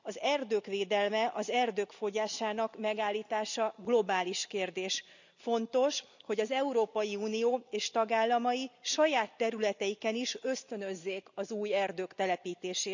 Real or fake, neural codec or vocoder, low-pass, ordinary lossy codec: real; none; 7.2 kHz; none